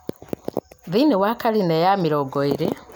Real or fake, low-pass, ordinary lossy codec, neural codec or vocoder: real; none; none; none